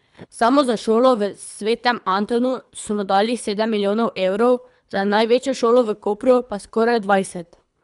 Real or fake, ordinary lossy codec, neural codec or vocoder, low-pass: fake; none; codec, 24 kHz, 3 kbps, HILCodec; 10.8 kHz